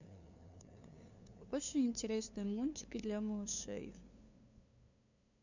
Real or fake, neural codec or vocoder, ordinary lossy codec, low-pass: fake; codec, 16 kHz, 2 kbps, FunCodec, trained on LibriTTS, 25 frames a second; none; 7.2 kHz